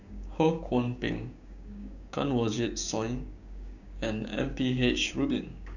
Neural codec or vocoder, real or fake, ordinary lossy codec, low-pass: codec, 16 kHz, 6 kbps, DAC; fake; none; 7.2 kHz